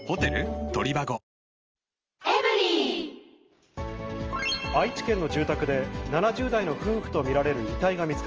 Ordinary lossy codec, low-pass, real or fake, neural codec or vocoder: Opus, 24 kbps; 7.2 kHz; real; none